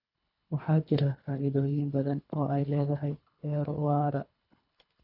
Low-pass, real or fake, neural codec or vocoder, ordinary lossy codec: 5.4 kHz; fake; codec, 24 kHz, 3 kbps, HILCodec; none